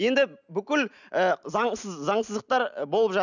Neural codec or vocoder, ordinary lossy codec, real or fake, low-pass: none; none; real; 7.2 kHz